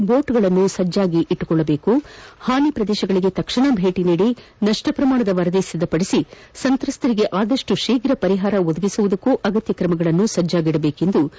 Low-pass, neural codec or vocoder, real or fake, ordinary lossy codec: none; none; real; none